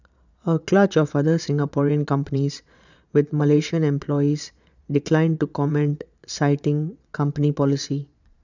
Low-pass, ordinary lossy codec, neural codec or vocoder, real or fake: 7.2 kHz; none; vocoder, 22.05 kHz, 80 mel bands, Vocos; fake